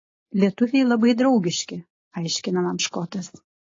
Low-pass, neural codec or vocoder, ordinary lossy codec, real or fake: 7.2 kHz; none; AAC, 32 kbps; real